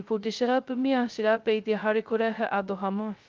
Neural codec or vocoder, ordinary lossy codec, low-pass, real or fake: codec, 16 kHz, 0.2 kbps, FocalCodec; Opus, 24 kbps; 7.2 kHz; fake